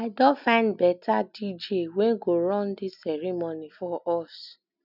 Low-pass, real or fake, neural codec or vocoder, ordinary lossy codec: 5.4 kHz; real; none; none